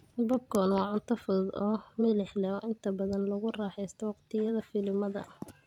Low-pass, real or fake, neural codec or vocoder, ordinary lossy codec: 19.8 kHz; fake; vocoder, 44.1 kHz, 128 mel bands every 512 samples, BigVGAN v2; none